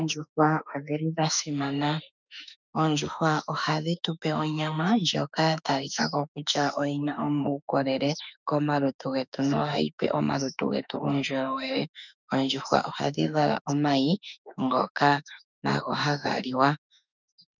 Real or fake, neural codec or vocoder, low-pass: fake; autoencoder, 48 kHz, 32 numbers a frame, DAC-VAE, trained on Japanese speech; 7.2 kHz